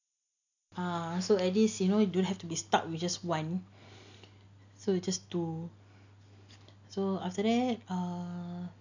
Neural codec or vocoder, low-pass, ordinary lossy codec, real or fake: none; 7.2 kHz; none; real